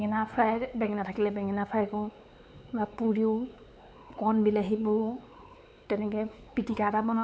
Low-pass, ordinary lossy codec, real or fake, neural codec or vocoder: none; none; fake; codec, 16 kHz, 4 kbps, X-Codec, WavLM features, trained on Multilingual LibriSpeech